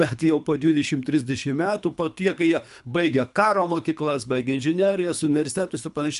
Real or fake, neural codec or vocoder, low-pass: fake; codec, 24 kHz, 3 kbps, HILCodec; 10.8 kHz